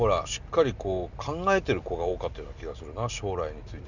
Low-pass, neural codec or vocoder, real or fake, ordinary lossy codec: 7.2 kHz; none; real; none